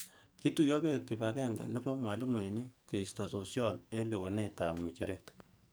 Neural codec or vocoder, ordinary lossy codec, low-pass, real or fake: codec, 44.1 kHz, 2.6 kbps, SNAC; none; none; fake